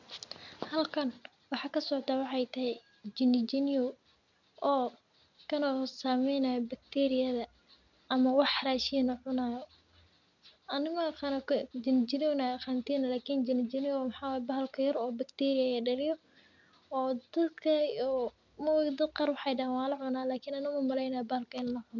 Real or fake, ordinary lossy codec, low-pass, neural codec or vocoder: real; none; 7.2 kHz; none